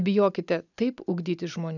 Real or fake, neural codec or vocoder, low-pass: real; none; 7.2 kHz